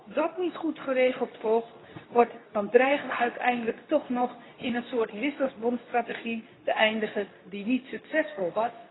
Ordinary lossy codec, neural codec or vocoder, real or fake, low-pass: AAC, 16 kbps; codec, 24 kHz, 0.9 kbps, WavTokenizer, medium speech release version 2; fake; 7.2 kHz